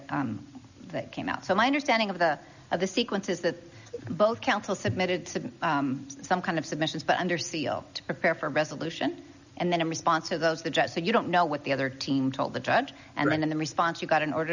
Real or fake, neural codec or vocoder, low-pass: real; none; 7.2 kHz